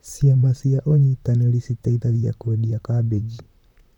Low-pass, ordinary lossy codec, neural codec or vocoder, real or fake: 19.8 kHz; none; vocoder, 44.1 kHz, 128 mel bands, Pupu-Vocoder; fake